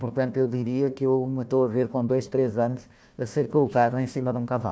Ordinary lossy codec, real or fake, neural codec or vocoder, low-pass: none; fake; codec, 16 kHz, 1 kbps, FunCodec, trained on Chinese and English, 50 frames a second; none